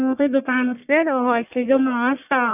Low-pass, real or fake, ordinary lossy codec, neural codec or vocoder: 3.6 kHz; fake; none; codec, 44.1 kHz, 1.7 kbps, Pupu-Codec